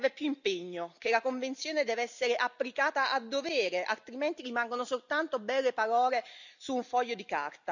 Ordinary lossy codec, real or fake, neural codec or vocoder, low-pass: none; real; none; 7.2 kHz